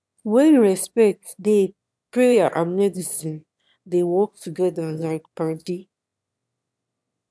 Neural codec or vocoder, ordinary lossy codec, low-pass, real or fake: autoencoder, 22.05 kHz, a latent of 192 numbers a frame, VITS, trained on one speaker; none; none; fake